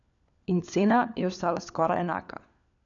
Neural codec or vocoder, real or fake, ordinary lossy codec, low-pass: codec, 16 kHz, 4 kbps, FunCodec, trained on LibriTTS, 50 frames a second; fake; none; 7.2 kHz